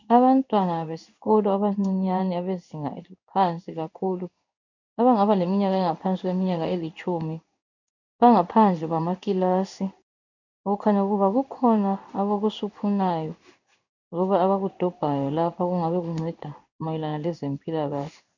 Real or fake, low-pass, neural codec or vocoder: fake; 7.2 kHz; codec, 16 kHz in and 24 kHz out, 1 kbps, XY-Tokenizer